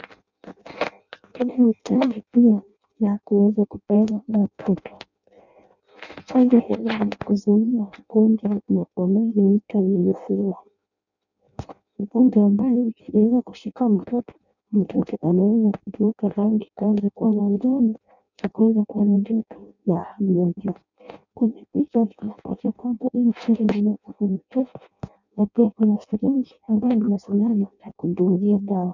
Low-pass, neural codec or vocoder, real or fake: 7.2 kHz; codec, 16 kHz in and 24 kHz out, 0.6 kbps, FireRedTTS-2 codec; fake